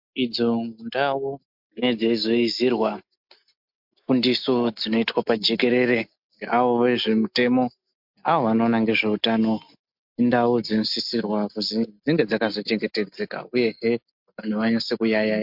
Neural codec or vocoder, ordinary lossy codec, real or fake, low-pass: none; MP3, 48 kbps; real; 5.4 kHz